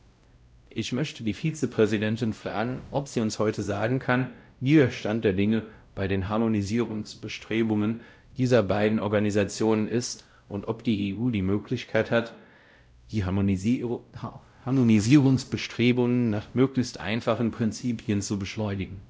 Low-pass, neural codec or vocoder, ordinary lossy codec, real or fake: none; codec, 16 kHz, 0.5 kbps, X-Codec, WavLM features, trained on Multilingual LibriSpeech; none; fake